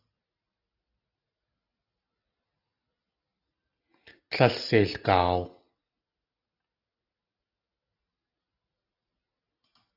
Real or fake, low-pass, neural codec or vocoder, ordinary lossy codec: real; 5.4 kHz; none; AAC, 32 kbps